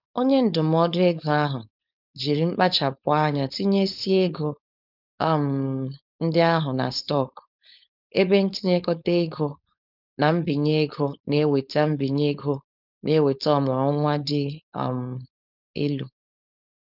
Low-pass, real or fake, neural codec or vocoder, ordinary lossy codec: 5.4 kHz; fake; codec, 16 kHz, 4.8 kbps, FACodec; none